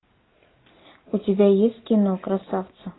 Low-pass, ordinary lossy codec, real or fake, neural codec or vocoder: 7.2 kHz; AAC, 16 kbps; real; none